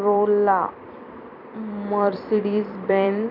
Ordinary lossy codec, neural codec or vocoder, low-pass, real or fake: none; vocoder, 44.1 kHz, 128 mel bands every 256 samples, BigVGAN v2; 5.4 kHz; fake